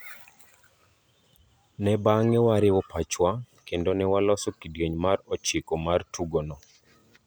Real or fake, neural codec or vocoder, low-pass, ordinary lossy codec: real; none; none; none